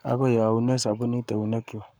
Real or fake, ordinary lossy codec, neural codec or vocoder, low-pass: fake; none; codec, 44.1 kHz, 7.8 kbps, Pupu-Codec; none